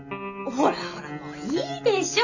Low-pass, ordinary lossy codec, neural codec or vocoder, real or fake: 7.2 kHz; none; none; real